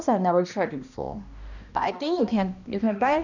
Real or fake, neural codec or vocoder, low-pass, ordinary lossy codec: fake; codec, 16 kHz, 1 kbps, X-Codec, HuBERT features, trained on balanced general audio; 7.2 kHz; none